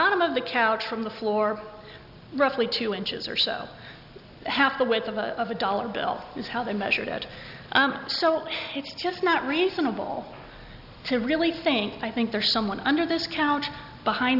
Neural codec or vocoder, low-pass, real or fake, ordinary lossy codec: none; 5.4 kHz; real; AAC, 48 kbps